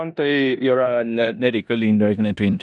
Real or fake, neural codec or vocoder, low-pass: fake; codec, 16 kHz in and 24 kHz out, 0.9 kbps, LongCat-Audio-Codec, four codebook decoder; 10.8 kHz